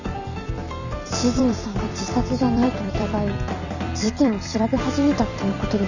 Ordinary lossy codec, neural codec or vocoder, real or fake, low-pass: none; vocoder, 44.1 kHz, 128 mel bands every 256 samples, BigVGAN v2; fake; 7.2 kHz